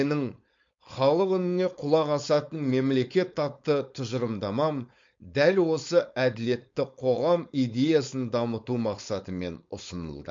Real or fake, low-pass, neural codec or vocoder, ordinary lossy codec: fake; 7.2 kHz; codec, 16 kHz, 4.8 kbps, FACodec; MP3, 48 kbps